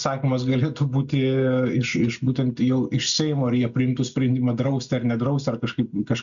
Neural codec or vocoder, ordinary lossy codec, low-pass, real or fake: none; MP3, 96 kbps; 7.2 kHz; real